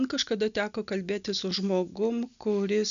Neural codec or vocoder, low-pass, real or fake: none; 7.2 kHz; real